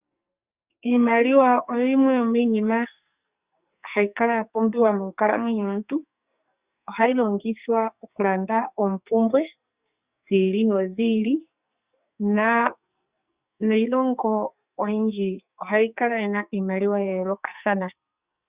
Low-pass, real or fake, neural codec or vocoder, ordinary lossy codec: 3.6 kHz; fake; codec, 44.1 kHz, 2.6 kbps, SNAC; Opus, 64 kbps